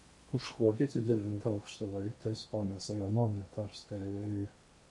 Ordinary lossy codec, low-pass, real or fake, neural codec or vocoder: MP3, 48 kbps; 10.8 kHz; fake; codec, 16 kHz in and 24 kHz out, 0.8 kbps, FocalCodec, streaming, 65536 codes